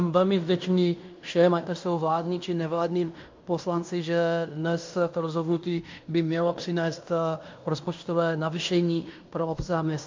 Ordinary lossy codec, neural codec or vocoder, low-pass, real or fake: MP3, 48 kbps; codec, 16 kHz in and 24 kHz out, 0.9 kbps, LongCat-Audio-Codec, fine tuned four codebook decoder; 7.2 kHz; fake